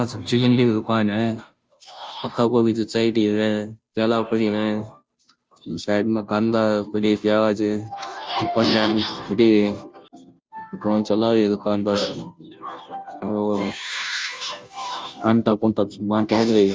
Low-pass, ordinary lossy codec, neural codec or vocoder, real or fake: none; none; codec, 16 kHz, 0.5 kbps, FunCodec, trained on Chinese and English, 25 frames a second; fake